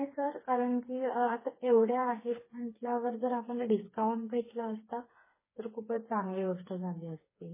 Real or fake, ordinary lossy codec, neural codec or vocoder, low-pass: fake; MP3, 16 kbps; codec, 16 kHz, 4 kbps, FreqCodec, smaller model; 3.6 kHz